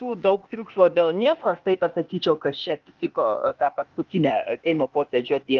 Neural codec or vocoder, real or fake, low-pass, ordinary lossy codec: codec, 16 kHz, 0.8 kbps, ZipCodec; fake; 7.2 kHz; Opus, 24 kbps